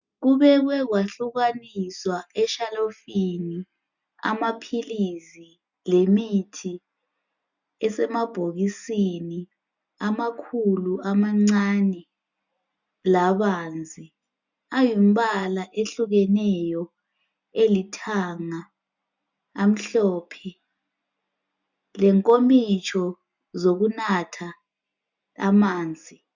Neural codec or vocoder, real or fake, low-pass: none; real; 7.2 kHz